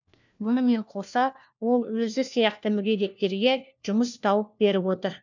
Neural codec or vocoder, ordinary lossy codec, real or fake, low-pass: codec, 16 kHz, 1 kbps, FunCodec, trained on LibriTTS, 50 frames a second; none; fake; 7.2 kHz